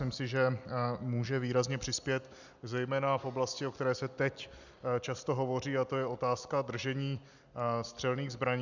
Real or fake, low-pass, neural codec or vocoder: real; 7.2 kHz; none